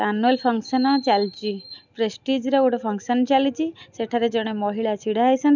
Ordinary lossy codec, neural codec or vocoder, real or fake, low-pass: none; none; real; 7.2 kHz